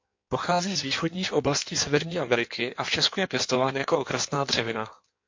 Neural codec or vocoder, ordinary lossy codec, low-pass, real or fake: codec, 16 kHz in and 24 kHz out, 1.1 kbps, FireRedTTS-2 codec; MP3, 48 kbps; 7.2 kHz; fake